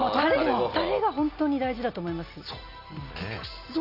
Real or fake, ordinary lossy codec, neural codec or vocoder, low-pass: real; none; none; 5.4 kHz